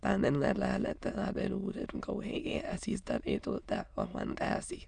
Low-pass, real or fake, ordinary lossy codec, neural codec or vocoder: 9.9 kHz; fake; none; autoencoder, 22.05 kHz, a latent of 192 numbers a frame, VITS, trained on many speakers